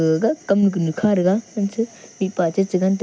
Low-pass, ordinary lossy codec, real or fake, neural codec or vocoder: none; none; real; none